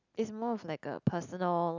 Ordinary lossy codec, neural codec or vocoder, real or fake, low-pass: none; none; real; 7.2 kHz